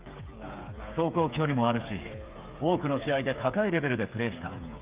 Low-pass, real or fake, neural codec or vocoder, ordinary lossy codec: 3.6 kHz; fake; codec, 16 kHz, 8 kbps, FreqCodec, smaller model; Opus, 32 kbps